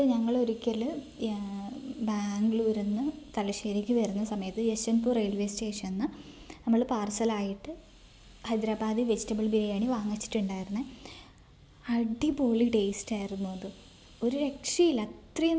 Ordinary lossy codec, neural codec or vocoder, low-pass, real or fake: none; none; none; real